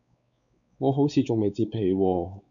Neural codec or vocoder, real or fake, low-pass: codec, 16 kHz, 4 kbps, X-Codec, WavLM features, trained on Multilingual LibriSpeech; fake; 7.2 kHz